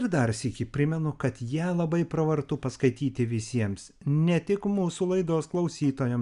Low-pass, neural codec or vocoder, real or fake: 10.8 kHz; none; real